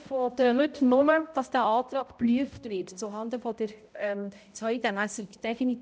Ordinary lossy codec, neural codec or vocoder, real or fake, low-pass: none; codec, 16 kHz, 0.5 kbps, X-Codec, HuBERT features, trained on balanced general audio; fake; none